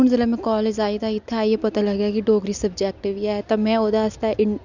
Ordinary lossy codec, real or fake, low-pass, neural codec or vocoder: none; real; 7.2 kHz; none